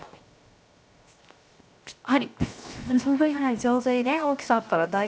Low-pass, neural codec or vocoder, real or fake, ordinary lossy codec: none; codec, 16 kHz, 0.7 kbps, FocalCodec; fake; none